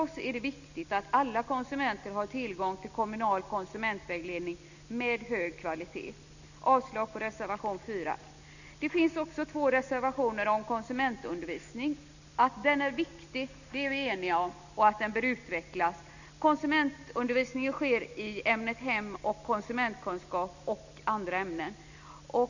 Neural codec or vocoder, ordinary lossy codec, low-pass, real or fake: none; none; 7.2 kHz; real